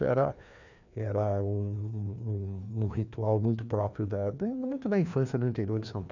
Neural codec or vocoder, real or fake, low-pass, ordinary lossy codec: codec, 16 kHz, 2 kbps, FreqCodec, larger model; fake; 7.2 kHz; none